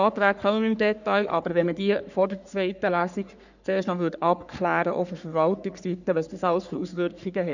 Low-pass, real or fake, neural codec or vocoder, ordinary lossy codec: 7.2 kHz; fake; codec, 44.1 kHz, 3.4 kbps, Pupu-Codec; none